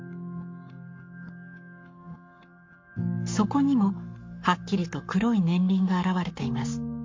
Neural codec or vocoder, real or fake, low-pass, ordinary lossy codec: codec, 44.1 kHz, 7.8 kbps, Pupu-Codec; fake; 7.2 kHz; MP3, 48 kbps